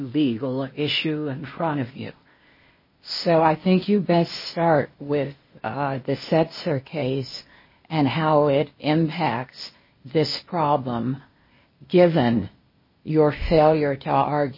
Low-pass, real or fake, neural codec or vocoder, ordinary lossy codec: 5.4 kHz; fake; codec, 16 kHz, 0.8 kbps, ZipCodec; MP3, 24 kbps